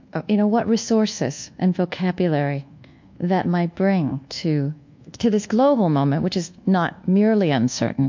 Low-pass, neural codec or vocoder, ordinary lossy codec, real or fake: 7.2 kHz; codec, 24 kHz, 1.2 kbps, DualCodec; MP3, 48 kbps; fake